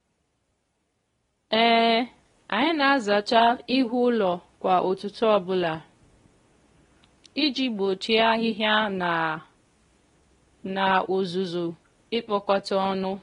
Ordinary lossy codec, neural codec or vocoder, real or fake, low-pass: AAC, 32 kbps; codec, 24 kHz, 0.9 kbps, WavTokenizer, medium speech release version 2; fake; 10.8 kHz